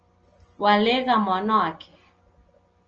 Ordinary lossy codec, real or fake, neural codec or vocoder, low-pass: Opus, 24 kbps; real; none; 7.2 kHz